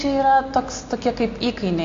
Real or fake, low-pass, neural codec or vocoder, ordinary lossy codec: real; 7.2 kHz; none; AAC, 48 kbps